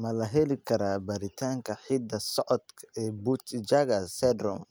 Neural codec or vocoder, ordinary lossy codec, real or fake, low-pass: none; none; real; none